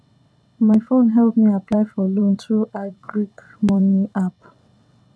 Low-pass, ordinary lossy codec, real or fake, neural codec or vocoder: none; none; fake; vocoder, 22.05 kHz, 80 mel bands, WaveNeXt